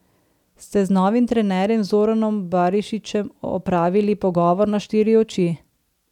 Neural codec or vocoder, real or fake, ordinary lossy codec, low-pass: none; real; none; 19.8 kHz